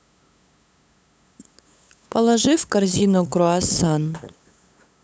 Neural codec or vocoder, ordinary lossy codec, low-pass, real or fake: codec, 16 kHz, 8 kbps, FunCodec, trained on LibriTTS, 25 frames a second; none; none; fake